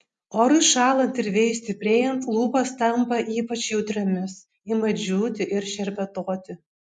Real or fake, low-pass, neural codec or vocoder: real; 10.8 kHz; none